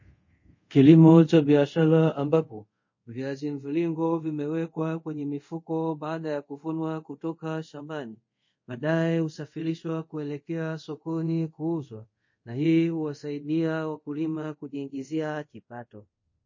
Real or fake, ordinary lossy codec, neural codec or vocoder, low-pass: fake; MP3, 32 kbps; codec, 24 kHz, 0.5 kbps, DualCodec; 7.2 kHz